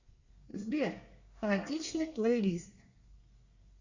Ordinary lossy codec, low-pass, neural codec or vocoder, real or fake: Opus, 64 kbps; 7.2 kHz; codec, 24 kHz, 1 kbps, SNAC; fake